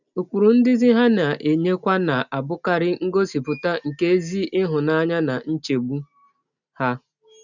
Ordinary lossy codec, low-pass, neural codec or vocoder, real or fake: none; 7.2 kHz; none; real